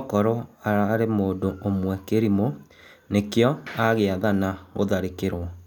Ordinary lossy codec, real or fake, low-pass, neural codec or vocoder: none; real; 19.8 kHz; none